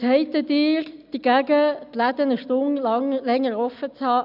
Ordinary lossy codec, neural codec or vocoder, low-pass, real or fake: none; none; 5.4 kHz; real